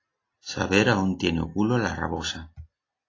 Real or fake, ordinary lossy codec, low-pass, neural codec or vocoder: real; AAC, 32 kbps; 7.2 kHz; none